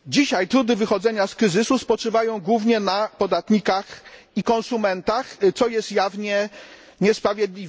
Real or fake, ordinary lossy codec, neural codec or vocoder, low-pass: real; none; none; none